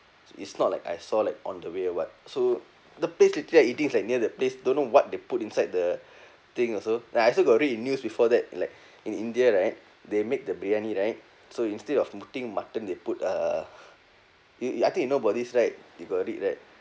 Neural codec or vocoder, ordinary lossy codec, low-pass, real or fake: none; none; none; real